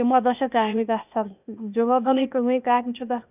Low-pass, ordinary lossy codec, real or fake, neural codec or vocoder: 3.6 kHz; none; fake; codec, 16 kHz, 0.8 kbps, ZipCodec